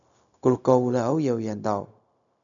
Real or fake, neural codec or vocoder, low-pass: fake; codec, 16 kHz, 0.4 kbps, LongCat-Audio-Codec; 7.2 kHz